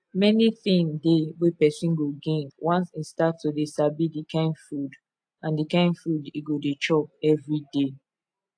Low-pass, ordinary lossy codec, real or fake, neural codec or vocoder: 9.9 kHz; AAC, 64 kbps; real; none